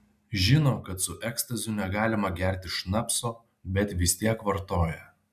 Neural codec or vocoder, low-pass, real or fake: none; 14.4 kHz; real